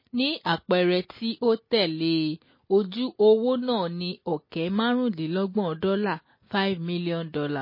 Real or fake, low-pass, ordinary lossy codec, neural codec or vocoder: real; 5.4 kHz; MP3, 24 kbps; none